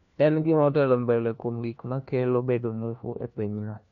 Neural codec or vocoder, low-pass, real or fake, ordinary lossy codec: codec, 16 kHz, 1 kbps, FunCodec, trained on LibriTTS, 50 frames a second; 7.2 kHz; fake; none